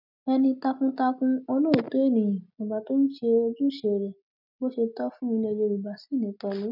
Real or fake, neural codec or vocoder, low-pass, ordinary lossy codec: real; none; 5.4 kHz; none